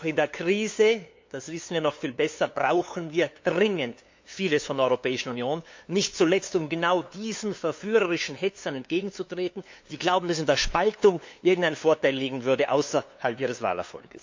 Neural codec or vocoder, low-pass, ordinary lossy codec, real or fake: codec, 16 kHz, 2 kbps, FunCodec, trained on LibriTTS, 25 frames a second; 7.2 kHz; MP3, 48 kbps; fake